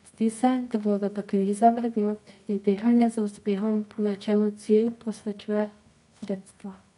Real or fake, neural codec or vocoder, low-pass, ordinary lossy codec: fake; codec, 24 kHz, 0.9 kbps, WavTokenizer, medium music audio release; 10.8 kHz; none